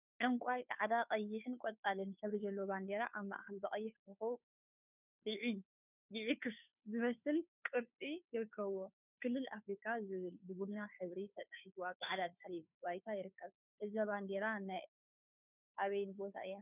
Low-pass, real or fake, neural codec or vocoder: 3.6 kHz; fake; codec, 16 kHz, 2 kbps, FunCodec, trained on Chinese and English, 25 frames a second